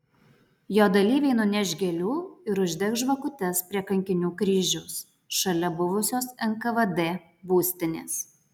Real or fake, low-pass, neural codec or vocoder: real; 19.8 kHz; none